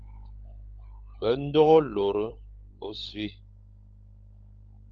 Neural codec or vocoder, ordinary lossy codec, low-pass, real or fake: codec, 16 kHz, 16 kbps, FunCodec, trained on LibriTTS, 50 frames a second; Opus, 32 kbps; 7.2 kHz; fake